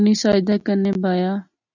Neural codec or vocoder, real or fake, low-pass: none; real; 7.2 kHz